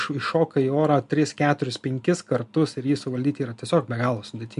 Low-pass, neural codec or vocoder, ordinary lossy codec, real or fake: 10.8 kHz; none; MP3, 64 kbps; real